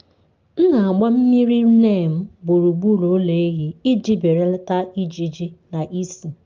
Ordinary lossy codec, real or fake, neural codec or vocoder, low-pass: Opus, 32 kbps; real; none; 7.2 kHz